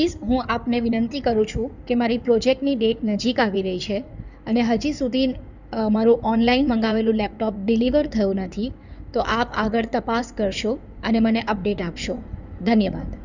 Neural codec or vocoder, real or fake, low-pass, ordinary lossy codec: codec, 16 kHz in and 24 kHz out, 2.2 kbps, FireRedTTS-2 codec; fake; 7.2 kHz; none